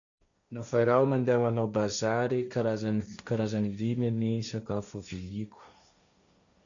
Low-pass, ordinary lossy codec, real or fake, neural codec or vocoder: 7.2 kHz; none; fake; codec, 16 kHz, 1.1 kbps, Voila-Tokenizer